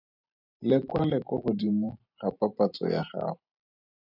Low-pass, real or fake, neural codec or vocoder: 5.4 kHz; fake; vocoder, 44.1 kHz, 128 mel bands every 512 samples, BigVGAN v2